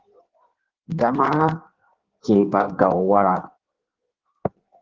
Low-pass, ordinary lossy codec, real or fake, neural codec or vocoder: 7.2 kHz; Opus, 16 kbps; fake; codec, 16 kHz in and 24 kHz out, 1.1 kbps, FireRedTTS-2 codec